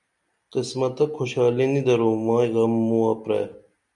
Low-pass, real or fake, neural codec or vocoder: 10.8 kHz; real; none